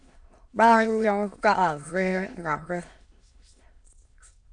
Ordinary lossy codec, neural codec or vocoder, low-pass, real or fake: MP3, 64 kbps; autoencoder, 22.05 kHz, a latent of 192 numbers a frame, VITS, trained on many speakers; 9.9 kHz; fake